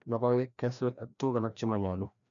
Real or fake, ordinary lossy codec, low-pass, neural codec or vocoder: fake; none; 7.2 kHz; codec, 16 kHz, 1 kbps, FreqCodec, larger model